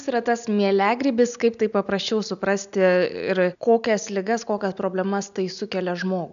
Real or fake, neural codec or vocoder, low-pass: real; none; 7.2 kHz